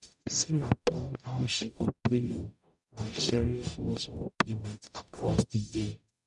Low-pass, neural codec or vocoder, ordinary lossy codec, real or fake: 10.8 kHz; codec, 44.1 kHz, 0.9 kbps, DAC; none; fake